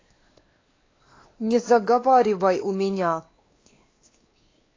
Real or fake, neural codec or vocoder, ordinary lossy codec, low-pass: fake; codec, 16 kHz, 2 kbps, X-Codec, WavLM features, trained on Multilingual LibriSpeech; AAC, 32 kbps; 7.2 kHz